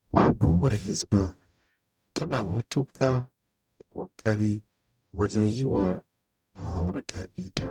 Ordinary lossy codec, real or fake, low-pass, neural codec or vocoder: none; fake; 19.8 kHz; codec, 44.1 kHz, 0.9 kbps, DAC